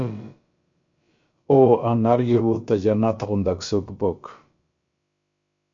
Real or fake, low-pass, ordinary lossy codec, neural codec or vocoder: fake; 7.2 kHz; MP3, 48 kbps; codec, 16 kHz, about 1 kbps, DyCAST, with the encoder's durations